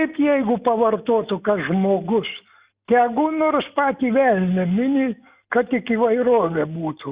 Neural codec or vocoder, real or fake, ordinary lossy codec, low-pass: none; real; Opus, 64 kbps; 3.6 kHz